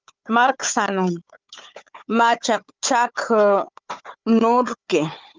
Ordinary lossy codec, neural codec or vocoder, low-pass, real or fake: Opus, 32 kbps; codec, 16 kHz, 4 kbps, FunCodec, trained on Chinese and English, 50 frames a second; 7.2 kHz; fake